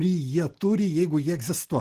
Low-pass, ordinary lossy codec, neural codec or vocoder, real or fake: 14.4 kHz; Opus, 16 kbps; none; real